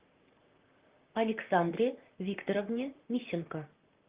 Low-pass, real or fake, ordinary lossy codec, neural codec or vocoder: 3.6 kHz; fake; Opus, 32 kbps; vocoder, 44.1 kHz, 128 mel bands, Pupu-Vocoder